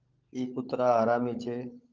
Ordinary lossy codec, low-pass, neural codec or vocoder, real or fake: Opus, 32 kbps; 7.2 kHz; codec, 16 kHz, 16 kbps, FunCodec, trained on LibriTTS, 50 frames a second; fake